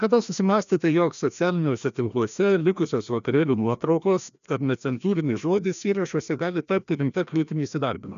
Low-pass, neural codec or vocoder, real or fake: 7.2 kHz; codec, 16 kHz, 1 kbps, FreqCodec, larger model; fake